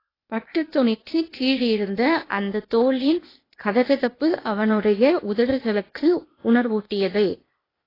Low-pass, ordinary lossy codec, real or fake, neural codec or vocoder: 5.4 kHz; AAC, 24 kbps; fake; codec, 16 kHz, 0.8 kbps, ZipCodec